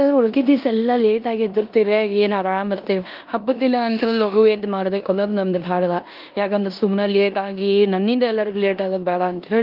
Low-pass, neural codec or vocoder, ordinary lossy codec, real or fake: 5.4 kHz; codec, 16 kHz in and 24 kHz out, 0.9 kbps, LongCat-Audio-Codec, four codebook decoder; Opus, 32 kbps; fake